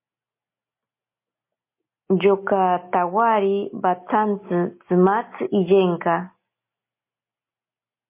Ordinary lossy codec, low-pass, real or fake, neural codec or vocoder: MP3, 24 kbps; 3.6 kHz; real; none